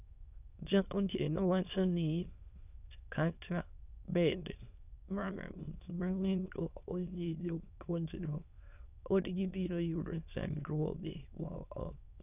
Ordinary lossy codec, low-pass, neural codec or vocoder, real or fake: none; 3.6 kHz; autoencoder, 22.05 kHz, a latent of 192 numbers a frame, VITS, trained on many speakers; fake